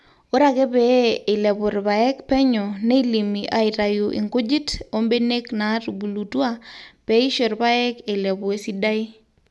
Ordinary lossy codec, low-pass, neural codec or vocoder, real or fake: none; none; none; real